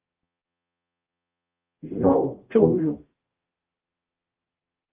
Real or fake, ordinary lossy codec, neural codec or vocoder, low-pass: fake; Opus, 32 kbps; codec, 44.1 kHz, 0.9 kbps, DAC; 3.6 kHz